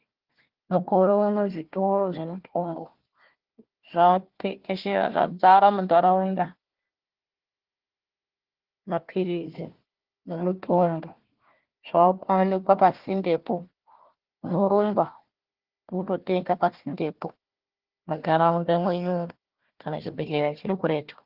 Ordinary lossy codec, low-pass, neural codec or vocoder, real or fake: Opus, 16 kbps; 5.4 kHz; codec, 16 kHz, 1 kbps, FunCodec, trained on Chinese and English, 50 frames a second; fake